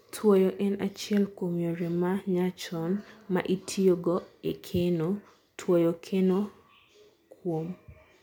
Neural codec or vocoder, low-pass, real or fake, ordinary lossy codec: none; 19.8 kHz; real; MP3, 96 kbps